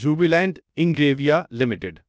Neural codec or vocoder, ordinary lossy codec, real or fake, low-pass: codec, 16 kHz, 0.7 kbps, FocalCodec; none; fake; none